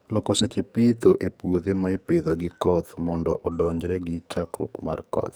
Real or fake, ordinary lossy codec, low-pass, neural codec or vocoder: fake; none; none; codec, 44.1 kHz, 2.6 kbps, SNAC